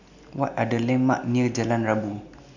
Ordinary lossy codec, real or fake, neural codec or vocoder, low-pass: none; real; none; 7.2 kHz